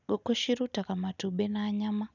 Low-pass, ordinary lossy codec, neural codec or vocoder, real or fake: 7.2 kHz; none; none; real